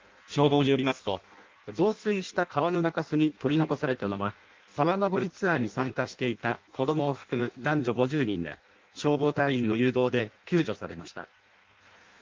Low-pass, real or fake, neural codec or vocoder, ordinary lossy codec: 7.2 kHz; fake; codec, 16 kHz in and 24 kHz out, 0.6 kbps, FireRedTTS-2 codec; Opus, 32 kbps